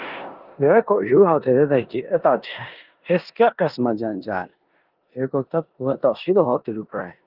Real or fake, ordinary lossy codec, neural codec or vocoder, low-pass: fake; Opus, 24 kbps; codec, 16 kHz in and 24 kHz out, 0.9 kbps, LongCat-Audio-Codec, four codebook decoder; 5.4 kHz